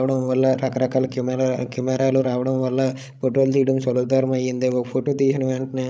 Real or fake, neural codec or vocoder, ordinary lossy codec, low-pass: fake; codec, 16 kHz, 16 kbps, FreqCodec, larger model; none; none